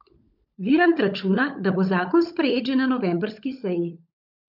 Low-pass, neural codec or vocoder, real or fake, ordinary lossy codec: 5.4 kHz; codec, 16 kHz, 16 kbps, FunCodec, trained on LibriTTS, 50 frames a second; fake; none